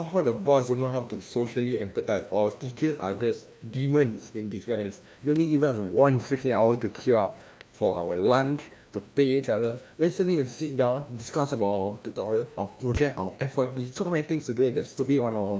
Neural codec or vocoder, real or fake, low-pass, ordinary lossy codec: codec, 16 kHz, 1 kbps, FreqCodec, larger model; fake; none; none